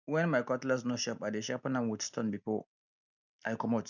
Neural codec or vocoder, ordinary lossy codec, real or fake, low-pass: none; none; real; 7.2 kHz